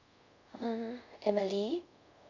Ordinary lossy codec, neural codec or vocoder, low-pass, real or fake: none; codec, 24 kHz, 0.5 kbps, DualCodec; 7.2 kHz; fake